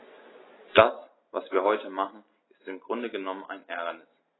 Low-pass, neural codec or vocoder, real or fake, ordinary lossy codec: 7.2 kHz; none; real; AAC, 16 kbps